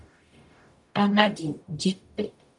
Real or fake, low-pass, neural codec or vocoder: fake; 10.8 kHz; codec, 44.1 kHz, 0.9 kbps, DAC